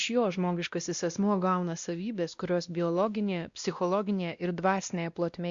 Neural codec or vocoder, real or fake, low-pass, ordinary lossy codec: codec, 16 kHz, 1 kbps, X-Codec, WavLM features, trained on Multilingual LibriSpeech; fake; 7.2 kHz; Opus, 64 kbps